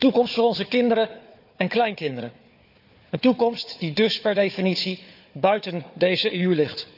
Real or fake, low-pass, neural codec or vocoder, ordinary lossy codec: fake; 5.4 kHz; codec, 16 kHz, 4 kbps, FunCodec, trained on Chinese and English, 50 frames a second; none